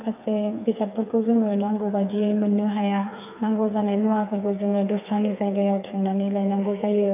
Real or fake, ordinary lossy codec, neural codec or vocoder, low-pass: fake; AAC, 32 kbps; codec, 16 kHz, 4 kbps, FreqCodec, smaller model; 3.6 kHz